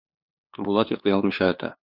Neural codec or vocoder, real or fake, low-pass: codec, 16 kHz, 2 kbps, FunCodec, trained on LibriTTS, 25 frames a second; fake; 5.4 kHz